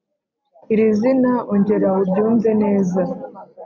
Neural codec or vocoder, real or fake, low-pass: none; real; 7.2 kHz